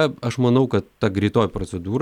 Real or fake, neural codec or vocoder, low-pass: real; none; 19.8 kHz